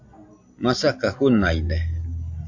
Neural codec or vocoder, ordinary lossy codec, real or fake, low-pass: none; AAC, 48 kbps; real; 7.2 kHz